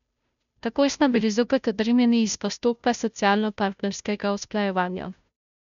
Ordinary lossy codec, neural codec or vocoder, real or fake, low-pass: none; codec, 16 kHz, 0.5 kbps, FunCodec, trained on Chinese and English, 25 frames a second; fake; 7.2 kHz